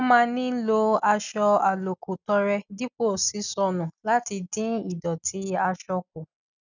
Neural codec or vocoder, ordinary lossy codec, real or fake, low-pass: none; none; real; 7.2 kHz